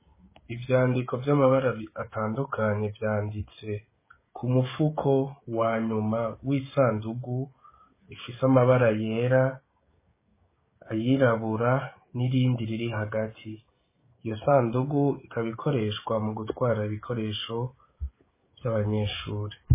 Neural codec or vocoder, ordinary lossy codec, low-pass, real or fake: codec, 16 kHz, 16 kbps, FreqCodec, smaller model; MP3, 16 kbps; 3.6 kHz; fake